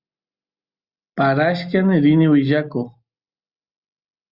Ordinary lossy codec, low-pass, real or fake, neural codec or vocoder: Opus, 64 kbps; 5.4 kHz; real; none